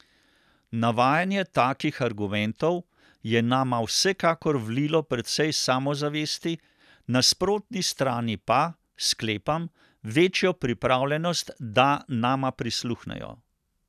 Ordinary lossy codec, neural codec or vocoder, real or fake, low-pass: none; none; real; 14.4 kHz